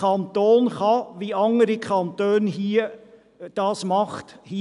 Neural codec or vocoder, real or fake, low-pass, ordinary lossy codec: none; real; 10.8 kHz; MP3, 96 kbps